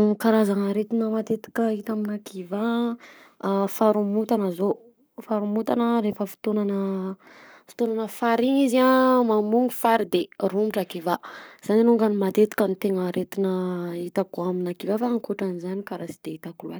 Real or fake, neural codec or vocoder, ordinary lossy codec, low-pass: fake; codec, 44.1 kHz, 7.8 kbps, Pupu-Codec; none; none